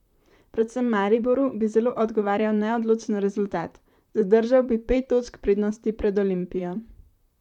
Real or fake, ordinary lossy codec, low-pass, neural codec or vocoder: fake; none; 19.8 kHz; vocoder, 44.1 kHz, 128 mel bands, Pupu-Vocoder